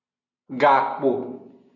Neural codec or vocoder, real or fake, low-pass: none; real; 7.2 kHz